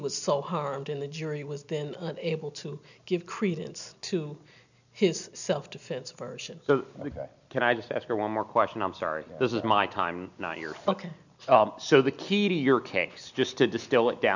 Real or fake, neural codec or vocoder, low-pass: real; none; 7.2 kHz